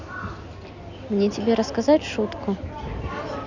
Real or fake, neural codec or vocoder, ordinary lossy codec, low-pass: real; none; none; 7.2 kHz